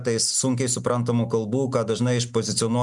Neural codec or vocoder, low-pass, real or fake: none; 10.8 kHz; real